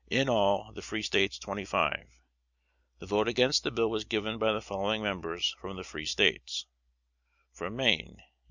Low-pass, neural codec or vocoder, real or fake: 7.2 kHz; none; real